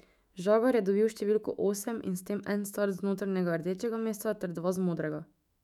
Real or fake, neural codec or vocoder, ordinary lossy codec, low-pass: fake; autoencoder, 48 kHz, 128 numbers a frame, DAC-VAE, trained on Japanese speech; none; 19.8 kHz